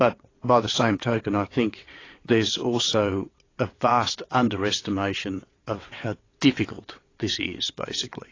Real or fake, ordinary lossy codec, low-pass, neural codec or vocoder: fake; AAC, 32 kbps; 7.2 kHz; vocoder, 22.05 kHz, 80 mel bands, WaveNeXt